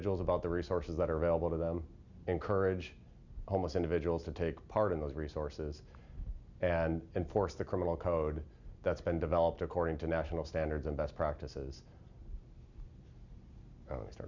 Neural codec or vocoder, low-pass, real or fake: none; 7.2 kHz; real